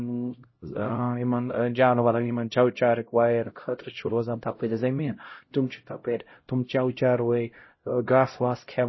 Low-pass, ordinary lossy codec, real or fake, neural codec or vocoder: 7.2 kHz; MP3, 24 kbps; fake; codec, 16 kHz, 0.5 kbps, X-Codec, HuBERT features, trained on LibriSpeech